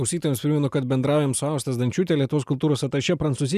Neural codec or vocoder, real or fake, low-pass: none; real; 14.4 kHz